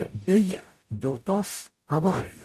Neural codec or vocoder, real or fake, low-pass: codec, 44.1 kHz, 0.9 kbps, DAC; fake; 14.4 kHz